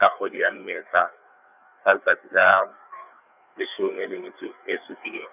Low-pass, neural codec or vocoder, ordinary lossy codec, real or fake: 3.6 kHz; codec, 16 kHz, 4 kbps, FreqCodec, larger model; none; fake